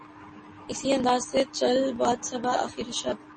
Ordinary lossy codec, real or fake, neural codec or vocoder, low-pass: MP3, 32 kbps; real; none; 9.9 kHz